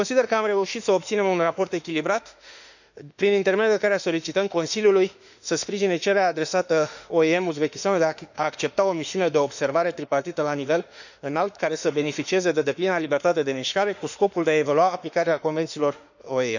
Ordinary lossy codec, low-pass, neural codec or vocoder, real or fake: none; 7.2 kHz; autoencoder, 48 kHz, 32 numbers a frame, DAC-VAE, trained on Japanese speech; fake